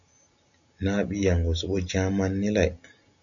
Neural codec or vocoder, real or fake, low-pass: none; real; 7.2 kHz